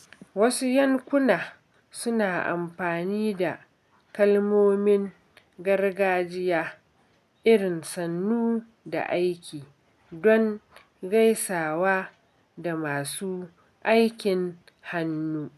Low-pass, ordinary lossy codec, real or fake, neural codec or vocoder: 14.4 kHz; none; real; none